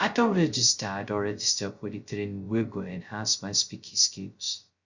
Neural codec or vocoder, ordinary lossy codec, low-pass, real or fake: codec, 16 kHz, 0.2 kbps, FocalCodec; Opus, 64 kbps; 7.2 kHz; fake